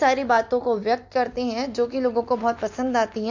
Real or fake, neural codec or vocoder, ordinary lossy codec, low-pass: real; none; MP3, 48 kbps; 7.2 kHz